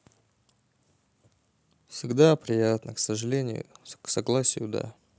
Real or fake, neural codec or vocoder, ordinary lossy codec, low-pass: real; none; none; none